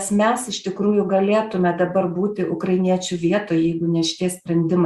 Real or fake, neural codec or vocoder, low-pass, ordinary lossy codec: real; none; 14.4 kHz; Opus, 64 kbps